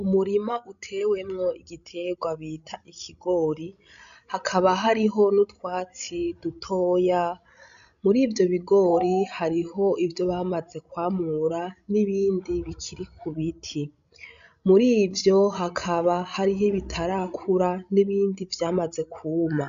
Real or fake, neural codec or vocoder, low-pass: fake; codec, 16 kHz, 16 kbps, FreqCodec, larger model; 7.2 kHz